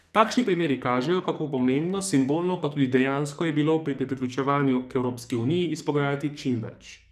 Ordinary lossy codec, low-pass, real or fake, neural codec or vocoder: none; 14.4 kHz; fake; codec, 44.1 kHz, 2.6 kbps, SNAC